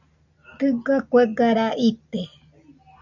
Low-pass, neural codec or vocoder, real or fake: 7.2 kHz; none; real